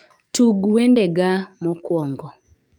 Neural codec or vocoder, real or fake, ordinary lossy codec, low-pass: codec, 44.1 kHz, 7.8 kbps, DAC; fake; none; 19.8 kHz